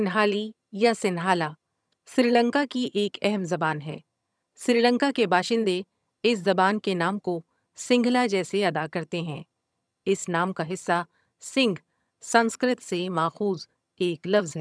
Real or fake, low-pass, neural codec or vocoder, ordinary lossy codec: fake; none; vocoder, 22.05 kHz, 80 mel bands, HiFi-GAN; none